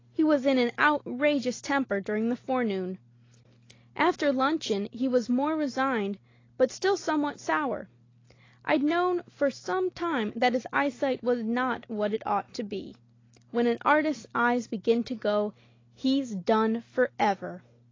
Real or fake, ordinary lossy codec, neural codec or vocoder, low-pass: real; AAC, 32 kbps; none; 7.2 kHz